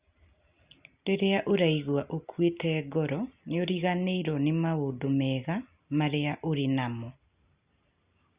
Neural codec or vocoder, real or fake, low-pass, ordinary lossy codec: none; real; 3.6 kHz; Opus, 64 kbps